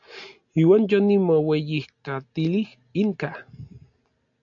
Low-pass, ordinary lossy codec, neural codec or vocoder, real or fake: 7.2 kHz; AAC, 64 kbps; none; real